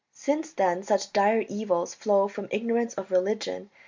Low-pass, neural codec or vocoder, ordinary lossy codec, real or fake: 7.2 kHz; none; MP3, 64 kbps; real